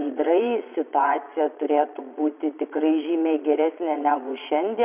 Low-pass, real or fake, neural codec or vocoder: 3.6 kHz; fake; vocoder, 22.05 kHz, 80 mel bands, Vocos